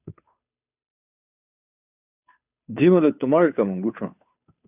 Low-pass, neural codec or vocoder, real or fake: 3.6 kHz; codec, 16 kHz, 2 kbps, FunCodec, trained on Chinese and English, 25 frames a second; fake